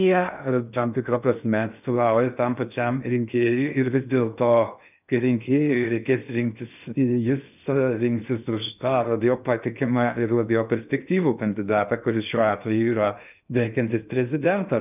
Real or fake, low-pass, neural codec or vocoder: fake; 3.6 kHz; codec, 16 kHz in and 24 kHz out, 0.6 kbps, FocalCodec, streaming, 2048 codes